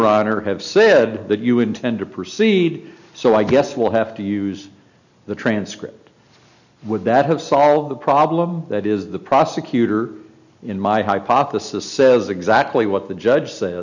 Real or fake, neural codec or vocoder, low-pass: real; none; 7.2 kHz